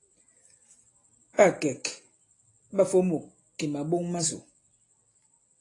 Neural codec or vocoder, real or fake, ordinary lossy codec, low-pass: none; real; AAC, 32 kbps; 10.8 kHz